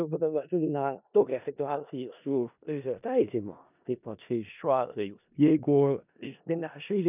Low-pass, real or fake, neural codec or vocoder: 3.6 kHz; fake; codec, 16 kHz in and 24 kHz out, 0.4 kbps, LongCat-Audio-Codec, four codebook decoder